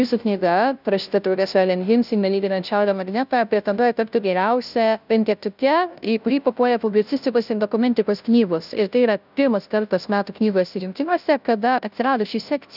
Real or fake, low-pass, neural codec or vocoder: fake; 5.4 kHz; codec, 16 kHz, 0.5 kbps, FunCodec, trained on Chinese and English, 25 frames a second